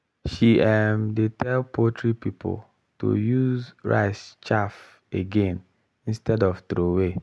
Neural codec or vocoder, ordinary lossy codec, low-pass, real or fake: none; none; none; real